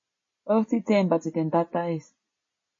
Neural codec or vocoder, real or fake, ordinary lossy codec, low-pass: none; real; MP3, 32 kbps; 7.2 kHz